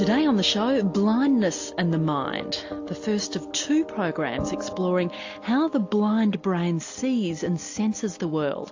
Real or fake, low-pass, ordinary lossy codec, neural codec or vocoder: real; 7.2 kHz; AAC, 48 kbps; none